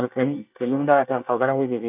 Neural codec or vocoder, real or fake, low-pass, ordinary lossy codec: codec, 24 kHz, 1 kbps, SNAC; fake; 3.6 kHz; none